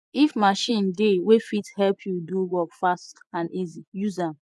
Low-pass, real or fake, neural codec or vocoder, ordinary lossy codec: none; fake; vocoder, 24 kHz, 100 mel bands, Vocos; none